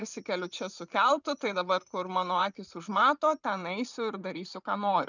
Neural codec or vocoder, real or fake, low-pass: vocoder, 44.1 kHz, 128 mel bands every 512 samples, BigVGAN v2; fake; 7.2 kHz